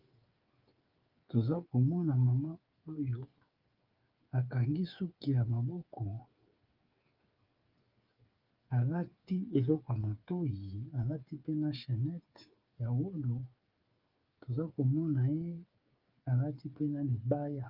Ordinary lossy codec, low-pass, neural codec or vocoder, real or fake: Opus, 32 kbps; 5.4 kHz; codec, 16 kHz, 8 kbps, FreqCodec, smaller model; fake